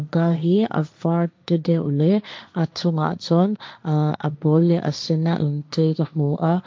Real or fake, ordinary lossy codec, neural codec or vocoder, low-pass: fake; none; codec, 16 kHz, 1.1 kbps, Voila-Tokenizer; none